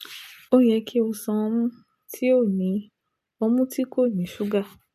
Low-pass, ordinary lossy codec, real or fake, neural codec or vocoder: 14.4 kHz; none; real; none